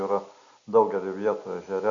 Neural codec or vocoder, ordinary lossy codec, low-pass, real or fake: none; AAC, 64 kbps; 7.2 kHz; real